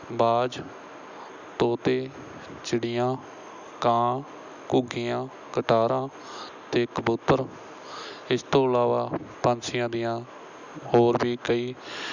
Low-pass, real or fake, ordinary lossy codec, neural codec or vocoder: 7.2 kHz; real; none; none